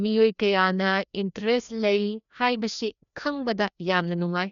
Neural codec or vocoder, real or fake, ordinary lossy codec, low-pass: codec, 16 kHz, 1 kbps, FreqCodec, larger model; fake; none; 7.2 kHz